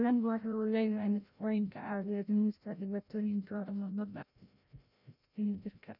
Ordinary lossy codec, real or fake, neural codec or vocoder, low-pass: none; fake; codec, 16 kHz, 0.5 kbps, FreqCodec, larger model; 5.4 kHz